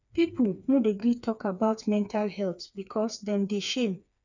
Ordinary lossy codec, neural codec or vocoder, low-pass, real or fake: none; codec, 16 kHz, 4 kbps, FreqCodec, smaller model; 7.2 kHz; fake